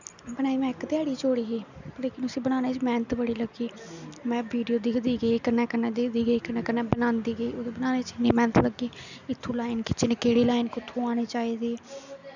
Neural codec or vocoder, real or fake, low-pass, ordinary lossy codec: none; real; 7.2 kHz; none